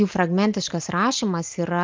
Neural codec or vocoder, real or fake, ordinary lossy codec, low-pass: none; real; Opus, 24 kbps; 7.2 kHz